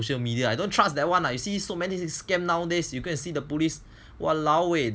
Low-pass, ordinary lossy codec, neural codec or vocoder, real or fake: none; none; none; real